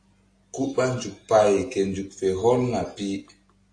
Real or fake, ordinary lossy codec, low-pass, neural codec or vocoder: real; AAC, 48 kbps; 9.9 kHz; none